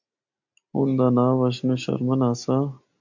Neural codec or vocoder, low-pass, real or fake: none; 7.2 kHz; real